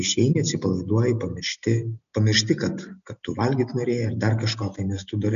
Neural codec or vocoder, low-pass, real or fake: none; 7.2 kHz; real